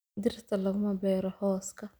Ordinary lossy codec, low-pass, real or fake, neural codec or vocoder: none; none; real; none